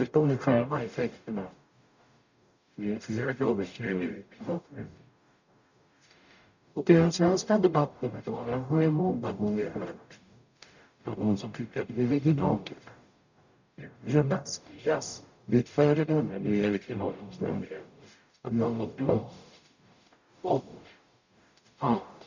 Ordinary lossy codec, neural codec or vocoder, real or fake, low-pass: none; codec, 44.1 kHz, 0.9 kbps, DAC; fake; 7.2 kHz